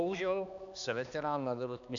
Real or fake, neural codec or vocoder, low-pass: fake; codec, 16 kHz, 2 kbps, X-Codec, HuBERT features, trained on balanced general audio; 7.2 kHz